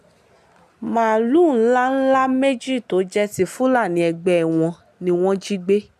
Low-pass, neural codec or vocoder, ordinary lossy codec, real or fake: 14.4 kHz; none; none; real